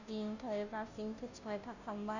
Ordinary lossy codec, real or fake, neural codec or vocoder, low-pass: none; fake; codec, 16 kHz, 0.5 kbps, FunCodec, trained on Chinese and English, 25 frames a second; 7.2 kHz